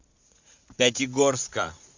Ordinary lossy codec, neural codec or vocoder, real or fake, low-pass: MP3, 64 kbps; vocoder, 44.1 kHz, 80 mel bands, Vocos; fake; 7.2 kHz